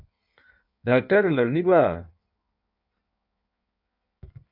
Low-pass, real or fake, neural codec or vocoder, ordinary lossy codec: 5.4 kHz; fake; codec, 16 kHz in and 24 kHz out, 1.1 kbps, FireRedTTS-2 codec; MP3, 48 kbps